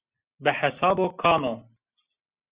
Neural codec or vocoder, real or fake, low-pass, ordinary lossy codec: none; real; 3.6 kHz; Opus, 64 kbps